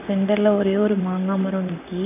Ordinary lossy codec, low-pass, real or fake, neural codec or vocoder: none; 3.6 kHz; fake; vocoder, 44.1 kHz, 128 mel bands, Pupu-Vocoder